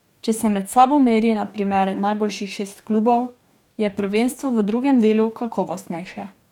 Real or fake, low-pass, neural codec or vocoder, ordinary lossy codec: fake; 19.8 kHz; codec, 44.1 kHz, 2.6 kbps, DAC; none